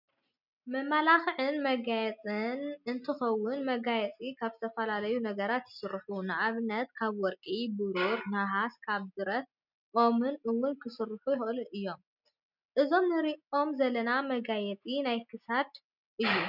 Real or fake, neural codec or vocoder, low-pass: real; none; 5.4 kHz